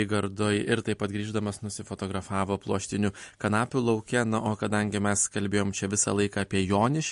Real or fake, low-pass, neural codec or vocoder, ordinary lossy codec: real; 14.4 kHz; none; MP3, 48 kbps